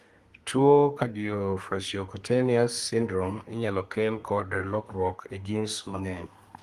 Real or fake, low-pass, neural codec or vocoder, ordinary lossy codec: fake; 14.4 kHz; codec, 32 kHz, 1.9 kbps, SNAC; Opus, 24 kbps